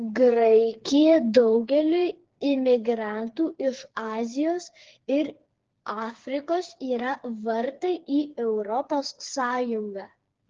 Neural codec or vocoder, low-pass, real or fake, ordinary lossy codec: codec, 16 kHz, 4 kbps, FreqCodec, smaller model; 7.2 kHz; fake; Opus, 16 kbps